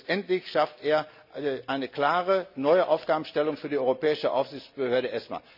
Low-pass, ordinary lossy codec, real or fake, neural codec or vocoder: 5.4 kHz; none; real; none